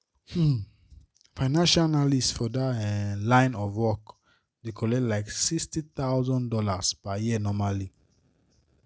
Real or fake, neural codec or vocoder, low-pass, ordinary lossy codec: real; none; none; none